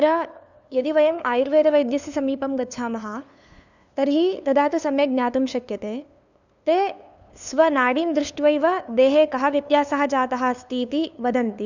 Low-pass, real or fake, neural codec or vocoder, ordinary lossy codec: 7.2 kHz; fake; codec, 16 kHz, 2 kbps, FunCodec, trained on LibriTTS, 25 frames a second; none